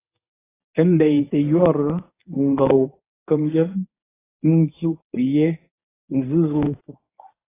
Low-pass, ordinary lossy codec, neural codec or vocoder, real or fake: 3.6 kHz; AAC, 16 kbps; codec, 24 kHz, 0.9 kbps, WavTokenizer, medium speech release version 1; fake